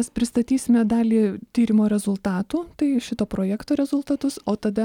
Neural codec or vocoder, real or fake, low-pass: none; real; 19.8 kHz